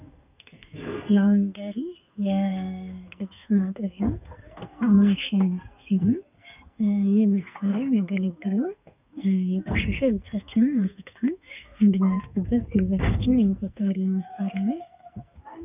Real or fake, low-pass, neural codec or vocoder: fake; 3.6 kHz; codec, 44.1 kHz, 2.6 kbps, SNAC